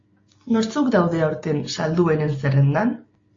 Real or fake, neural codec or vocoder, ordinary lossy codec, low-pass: real; none; AAC, 32 kbps; 7.2 kHz